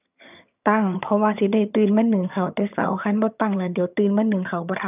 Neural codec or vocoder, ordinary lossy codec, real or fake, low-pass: vocoder, 22.05 kHz, 80 mel bands, HiFi-GAN; none; fake; 3.6 kHz